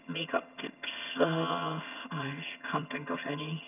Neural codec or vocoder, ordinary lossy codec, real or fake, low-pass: vocoder, 22.05 kHz, 80 mel bands, HiFi-GAN; none; fake; 3.6 kHz